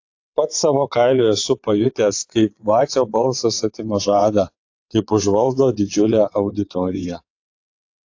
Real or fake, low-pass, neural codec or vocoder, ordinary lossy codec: fake; 7.2 kHz; vocoder, 22.05 kHz, 80 mel bands, WaveNeXt; AAC, 48 kbps